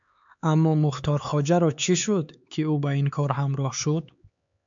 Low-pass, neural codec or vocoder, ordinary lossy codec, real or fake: 7.2 kHz; codec, 16 kHz, 4 kbps, X-Codec, HuBERT features, trained on LibriSpeech; MP3, 64 kbps; fake